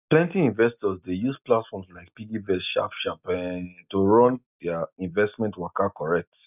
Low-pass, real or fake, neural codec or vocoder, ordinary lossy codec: 3.6 kHz; real; none; none